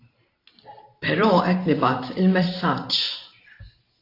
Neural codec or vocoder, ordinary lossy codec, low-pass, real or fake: vocoder, 44.1 kHz, 128 mel bands every 512 samples, BigVGAN v2; AAC, 32 kbps; 5.4 kHz; fake